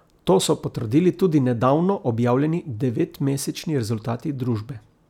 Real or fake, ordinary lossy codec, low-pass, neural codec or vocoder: real; none; 19.8 kHz; none